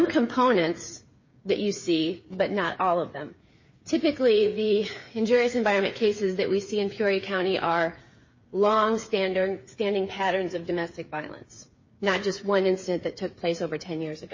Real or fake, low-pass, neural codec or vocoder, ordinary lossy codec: fake; 7.2 kHz; codec, 16 kHz, 8 kbps, FreqCodec, smaller model; MP3, 32 kbps